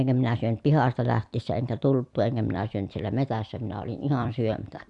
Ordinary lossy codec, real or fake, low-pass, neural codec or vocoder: AAC, 64 kbps; fake; 10.8 kHz; vocoder, 44.1 kHz, 128 mel bands every 256 samples, BigVGAN v2